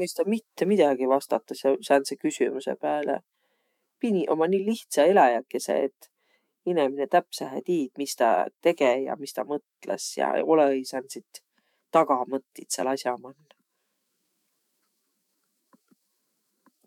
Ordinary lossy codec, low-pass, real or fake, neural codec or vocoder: MP3, 96 kbps; 19.8 kHz; fake; autoencoder, 48 kHz, 128 numbers a frame, DAC-VAE, trained on Japanese speech